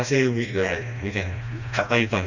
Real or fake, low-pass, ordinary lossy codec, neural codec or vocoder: fake; 7.2 kHz; none; codec, 16 kHz, 1 kbps, FreqCodec, smaller model